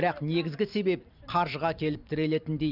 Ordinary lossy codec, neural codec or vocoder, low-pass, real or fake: none; vocoder, 44.1 kHz, 128 mel bands every 512 samples, BigVGAN v2; 5.4 kHz; fake